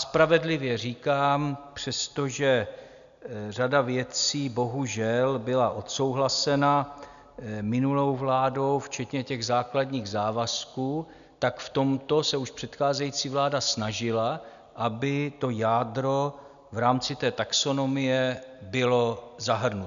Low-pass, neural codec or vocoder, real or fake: 7.2 kHz; none; real